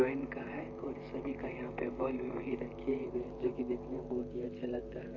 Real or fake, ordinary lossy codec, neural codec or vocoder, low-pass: fake; MP3, 32 kbps; vocoder, 44.1 kHz, 128 mel bands, Pupu-Vocoder; 7.2 kHz